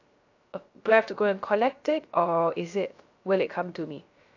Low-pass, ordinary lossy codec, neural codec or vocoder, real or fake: 7.2 kHz; AAC, 48 kbps; codec, 16 kHz, 0.3 kbps, FocalCodec; fake